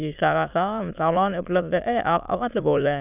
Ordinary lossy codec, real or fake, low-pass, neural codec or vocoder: none; fake; 3.6 kHz; autoencoder, 22.05 kHz, a latent of 192 numbers a frame, VITS, trained on many speakers